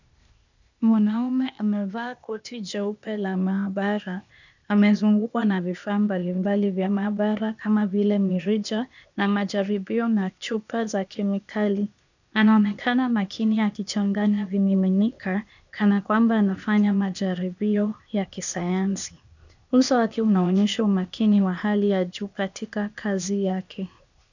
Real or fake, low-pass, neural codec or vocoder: fake; 7.2 kHz; codec, 16 kHz, 0.8 kbps, ZipCodec